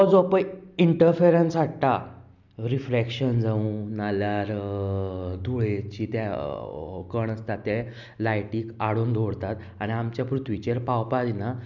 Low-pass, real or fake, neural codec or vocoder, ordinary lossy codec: 7.2 kHz; real; none; none